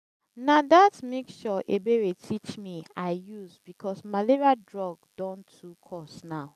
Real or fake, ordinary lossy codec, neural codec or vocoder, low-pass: real; none; none; 14.4 kHz